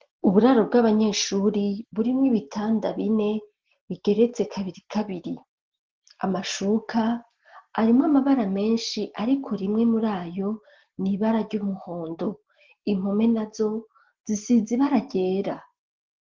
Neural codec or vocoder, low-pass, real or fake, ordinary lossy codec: none; 7.2 kHz; real; Opus, 16 kbps